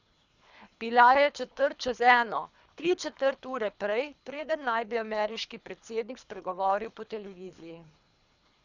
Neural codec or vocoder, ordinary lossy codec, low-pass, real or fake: codec, 24 kHz, 3 kbps, HILCodec; none; 7.2 kHz; fake